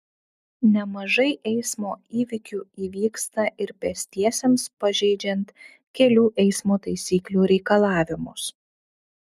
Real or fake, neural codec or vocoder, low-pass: real; none; 14.4 kHz